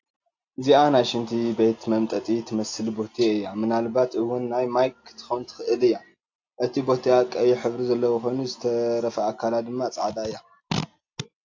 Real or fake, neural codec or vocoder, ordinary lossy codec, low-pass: real; none; AAC, 48 kbps; 7.2 kHz